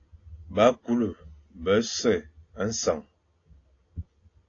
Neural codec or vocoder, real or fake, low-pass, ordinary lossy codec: none; real; 7.2 kHz; AAC, 32 kbps